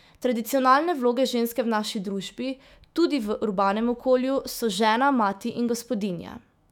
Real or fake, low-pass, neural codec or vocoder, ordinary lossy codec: fake; 19.8 kHz; autoencoder, 48 kHz, 128 numbers a frame, DAC-VAE, trained on Japanese speech; none